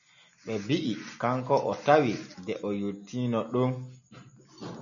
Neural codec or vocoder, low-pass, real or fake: none; 7.2 kHz; real